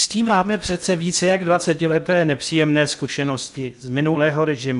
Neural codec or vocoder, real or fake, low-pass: codec, 16 kHz in and 24 kHz out, 0.6 kbps, FocalCodec, streaming, 4096 codes; fake; 10.8 kHz